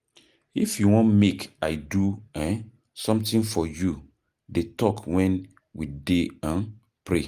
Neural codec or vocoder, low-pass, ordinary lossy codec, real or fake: none; 14.4 kHz; Opus, 32 kbps; real